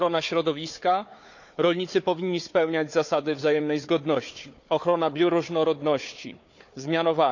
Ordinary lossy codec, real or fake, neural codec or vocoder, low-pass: none; fake; codec, 16 kHz, 4 kbps, FunCodec, trained on Chinese and English, 50 frames a second; 7.2 kHz